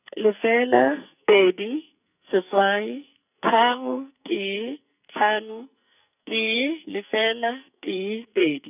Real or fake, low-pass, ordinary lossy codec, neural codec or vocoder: fake; 3.6 kHz; none; codec, 44.1 kHz, 2.6 kbps, SNAC